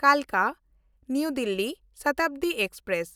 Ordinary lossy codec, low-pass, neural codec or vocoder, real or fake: none; none; none; real